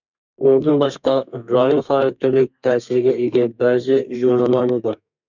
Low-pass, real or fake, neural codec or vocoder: 7.2 kHz; fake; codec, 32 kHz, 1.9 kbps, SNAC